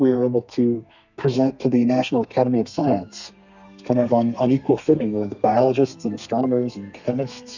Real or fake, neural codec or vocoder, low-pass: fake; codec, 32 kHz, 1.9 kbps, SNAC; 7.2 kHz